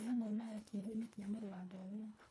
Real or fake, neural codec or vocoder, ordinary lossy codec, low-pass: fake; codec, 24 kHz, 1.5 kbps, HILCodec; none; none